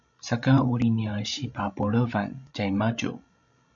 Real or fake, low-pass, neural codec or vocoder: fake; 7.2 kHz; codec, 16 kHz, 16 kbps, FreqCodec, larger model